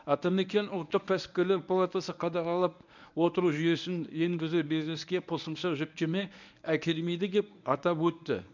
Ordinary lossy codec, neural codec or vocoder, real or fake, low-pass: none; codec, 24 kHz, 0.9 kbps, WavTokenizer, medium speech release version 1; fake; 7.2 kHz